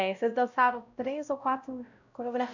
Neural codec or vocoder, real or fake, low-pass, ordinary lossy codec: codec, 16 kHz, 0.5 kbps, X-Codec, WavLM features, trained on Multilingual LibriSpeech; fake; 7.2 kHz; none